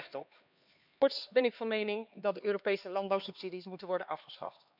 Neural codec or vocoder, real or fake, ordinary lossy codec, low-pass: codec, 16 kHz, 2 kbps, X-Codec, HuBERT features, trained on LibriSpeech; fake; none; 5.4 kHz